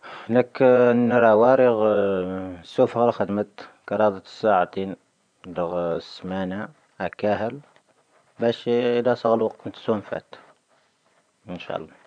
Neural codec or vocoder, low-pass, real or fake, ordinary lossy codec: vocoder, 24 kHz, 100 mel bands, Vocos; 9.9 kHz; fake; none